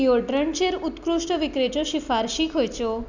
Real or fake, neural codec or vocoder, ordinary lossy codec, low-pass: real; none; none; 7.2 kHz